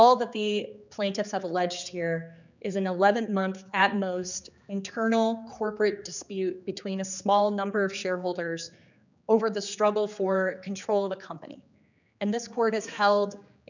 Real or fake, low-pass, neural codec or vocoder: fake; 7.2 kHz; codec, 16 kHz, 4 kbps, X-Codec, HuBERT features, trained on general audio